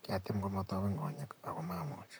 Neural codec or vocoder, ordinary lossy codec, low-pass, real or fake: vocoder, 44.1 kHz, 128 mel bands, Pupu-Vocoder; none; none; fake